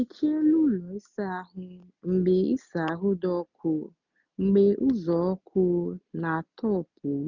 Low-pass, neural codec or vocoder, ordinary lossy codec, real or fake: 7.2 kHz; none; none; real